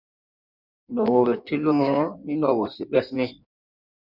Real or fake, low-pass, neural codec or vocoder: fake; 5.4 kHz; codec, 16 kHz in and 24 kHz out, 1.1 kbps, FireRedTTS-2 codec